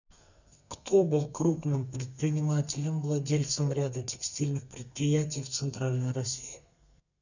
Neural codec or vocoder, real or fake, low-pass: codec, 32 kHz, 1.9 kbps, SNAC; fake; 7.2 kHz